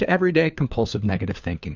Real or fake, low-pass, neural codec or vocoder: fake; 7.2 kHz; codec, 16 kHz, 4 kbps, FunCodec, trained on LibriTTS, 50 frames a second